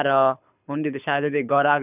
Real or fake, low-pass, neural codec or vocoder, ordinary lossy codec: fake; 3.6 kHz; codec, 24 kHz, 6 kbps, HILCodec; none